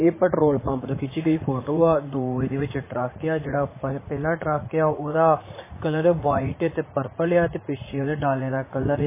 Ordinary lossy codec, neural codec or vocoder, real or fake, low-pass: MP3, 16 kbps; vocoder, 44.1 kHz, 80 mel bands, Vocos; fake; 3.6 kHz